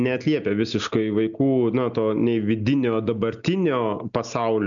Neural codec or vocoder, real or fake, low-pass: none; real; 7.2 kHz